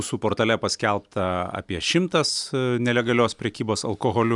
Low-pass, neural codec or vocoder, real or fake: 10.8 kHz; none; real